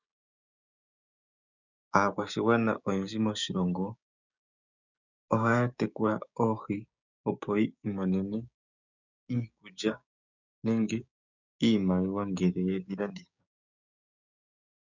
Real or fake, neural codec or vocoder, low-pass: fake; codec, 16 kHz, 6 kbps, DAC; 7.2 kHz